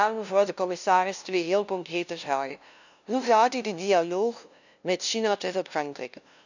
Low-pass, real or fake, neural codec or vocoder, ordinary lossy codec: 7.2 kHz; fake; codec, 16 kHz, 0.5 kbps, FunCodec, trained on LibriTTS, 25 frames a second; none